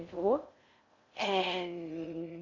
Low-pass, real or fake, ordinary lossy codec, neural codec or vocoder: 7.2 kHz; fake; Opus, 64 kbps; codec, 16 kHz in and 24 kHz out, 0.6 kbps, FocalCodec, streaming, 4096 codes